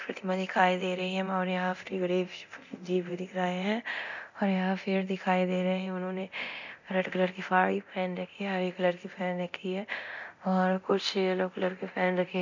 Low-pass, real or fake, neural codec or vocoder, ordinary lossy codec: 7.2 kHz; fake; codec, 24 kHz, 0.9 kbps, DualCodec; none